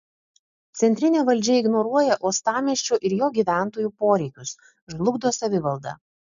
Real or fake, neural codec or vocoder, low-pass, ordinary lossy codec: real; none; 7.2 kHz; MP3, 64 kbps